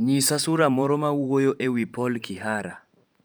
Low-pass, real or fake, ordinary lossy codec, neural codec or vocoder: none; fake; none; vocoder, 44.1 kHz, 128 mel bands every 512 samples, BigVGAN v2